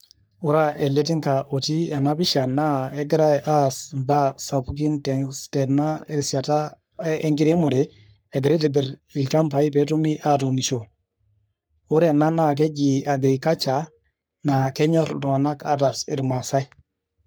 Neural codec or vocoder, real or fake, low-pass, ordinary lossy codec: codec, 44.1 kHz, 3.4 kbps, Pupu-Codec; fake; none; none